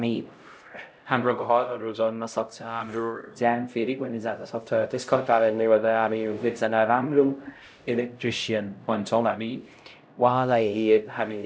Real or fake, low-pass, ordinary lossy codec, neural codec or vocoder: fake; none; none; codec, 16 kHz, 0.5 kbps, X-Codec, HuBERT features, trained on LibriSpeech